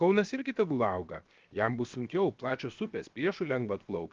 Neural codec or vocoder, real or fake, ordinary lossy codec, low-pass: codec, 16 kHz, 0.7 kbps, FocalCodec; fake; Opus, 32 kbps; 7.2 kHz